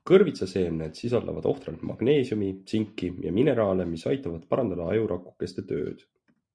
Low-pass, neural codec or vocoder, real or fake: 9.9 kHz; none; real